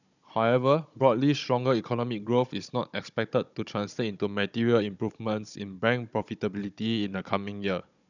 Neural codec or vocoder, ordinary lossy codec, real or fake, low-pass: codec, 16 kHz, 16 kbps, FunCodec, trained on Chinese and English, 50 frames a second; none; fake; 7.2 kHz